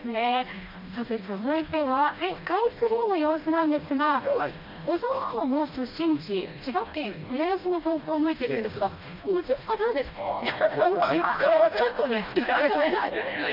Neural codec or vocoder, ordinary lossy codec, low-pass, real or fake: codec, 16 kHz, 1 kbps, FreqCodec, smaller model; MP3, 48 kbps; 5.4 kHz; fake